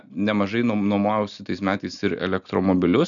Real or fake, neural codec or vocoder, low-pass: real; none; 7.2 kHz